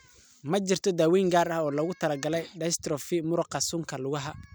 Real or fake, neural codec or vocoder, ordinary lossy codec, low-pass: real; none; none; none